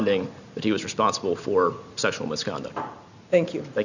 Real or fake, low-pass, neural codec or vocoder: real; 7.2 kHz; none